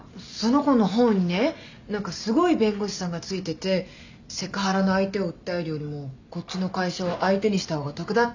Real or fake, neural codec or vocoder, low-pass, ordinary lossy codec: real; none; 7.2 kHz; none